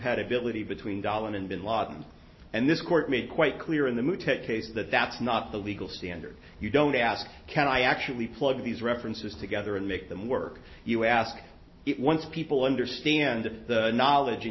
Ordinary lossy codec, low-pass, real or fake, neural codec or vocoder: MP3, 24 kbps; 7.2 kHz; real; none